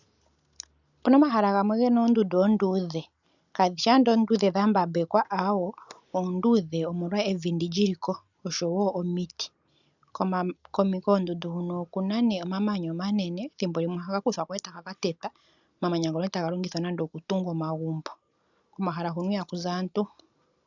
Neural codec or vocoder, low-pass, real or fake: none; 7.2 kHz; real